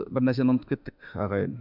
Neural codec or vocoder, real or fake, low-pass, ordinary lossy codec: autoencoder, 48 kHz, 32 numbers a frame, DAC-VAE, trained on Japanese speech; fake; 5.4 kHz; none